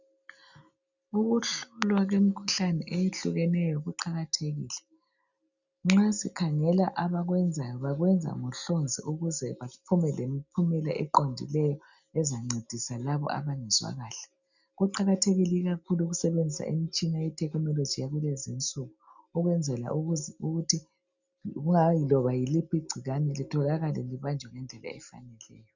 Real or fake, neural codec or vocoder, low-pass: real; none; 7.2 kHz